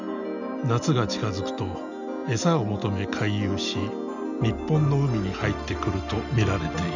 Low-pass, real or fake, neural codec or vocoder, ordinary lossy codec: 7.2 kHz; real; none; none